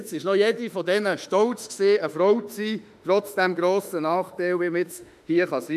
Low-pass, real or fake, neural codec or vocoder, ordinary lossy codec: 14.4 kHz; fake; autoencoder, 48 kHz, 32 numbers a frame, DAC-VAE, trained on Japanese speech; none